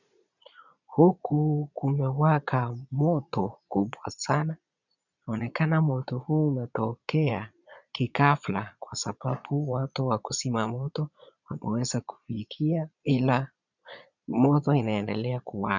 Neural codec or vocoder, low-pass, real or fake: vocoder, 22.05 kHz, 80 mel bands, Vocos; 7.2 kHz; fake